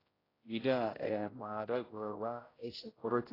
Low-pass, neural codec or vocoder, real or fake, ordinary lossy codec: 5.4 kHz; codec, 16 kHz, 0.5 kbps, X-Codec, HuBERT features, trained on general audio; fake; AAC, 24 kbps